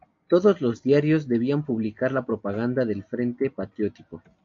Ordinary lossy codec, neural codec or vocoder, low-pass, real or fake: AAC, 48 kbps; none; 7.2 kHz; real